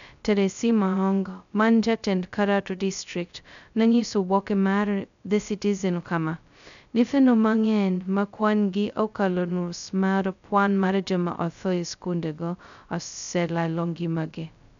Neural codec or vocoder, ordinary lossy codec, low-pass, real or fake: codec, 16 kHz, 0.2 kbps, FocalCodec; none; 7.2 kHz; fake